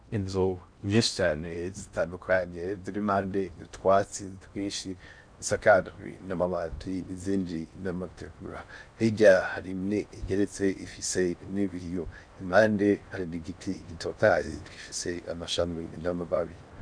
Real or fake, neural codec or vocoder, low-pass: fake; codec, 16 kHz in and 24 kHz out, 0.6 kbps, FocalCodec, streaming, 2048 codes; 9.9 kHz